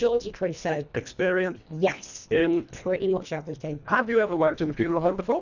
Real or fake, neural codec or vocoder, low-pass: fake; codec, 24 kHz, 1.5 kbps, HILCodec; 7.2 kHz